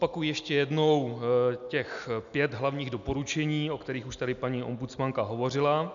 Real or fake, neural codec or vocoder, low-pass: real; none; 7.2 kHz